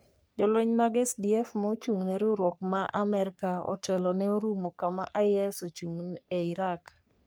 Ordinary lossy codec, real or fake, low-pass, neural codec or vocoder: none; fake; none; codec, 44.1 kHz, 3.4 kbps, Pupu-Codec